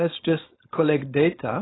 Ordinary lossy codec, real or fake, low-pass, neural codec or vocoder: AAC, 16 kbps; fake; 7.2 kHz; codec, 16 kHz, 4.8 kbps, FACodec